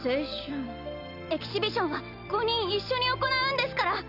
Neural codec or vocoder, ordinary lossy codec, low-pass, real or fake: none; Opus, 64 kbps; 5.4 kHz; real